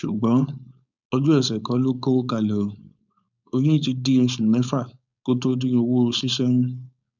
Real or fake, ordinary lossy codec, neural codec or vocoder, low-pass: fake; none; codec, 16 kHz, 4.8 kbps, FACodec; 7.2 kHz